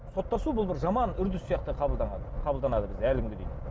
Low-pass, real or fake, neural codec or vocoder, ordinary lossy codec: none; real; none; none